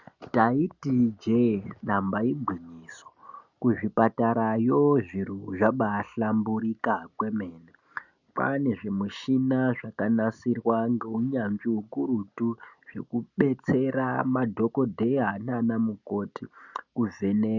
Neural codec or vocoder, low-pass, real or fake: none; 7.2 kHz; real